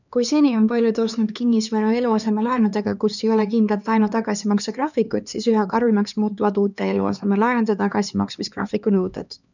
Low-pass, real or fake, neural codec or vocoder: 7.2 kHz; fake; codec, 16 kHz, 2 kbps, X-Codec, HuBERT features, trained on LibriSpeech